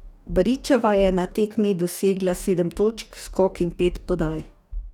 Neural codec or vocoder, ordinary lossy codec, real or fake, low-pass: codec, 44.1 kHz, 2.6 kbps, DAC; none; fake; 19.8 kHz